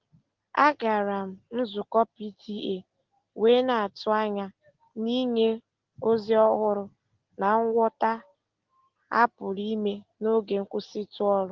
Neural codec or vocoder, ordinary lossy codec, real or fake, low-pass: none; Opus, 16 kbps; real; 7.2 kHz